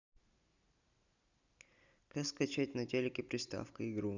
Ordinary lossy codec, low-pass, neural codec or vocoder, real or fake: none; 7.2 kHz; none; real